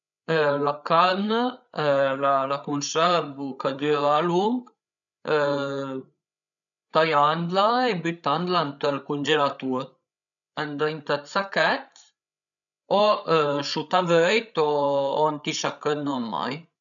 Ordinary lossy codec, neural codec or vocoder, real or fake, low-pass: none; codec, 16 kHz, 8 kbps, FreqCodec, larger model; fake; 7.2 kHz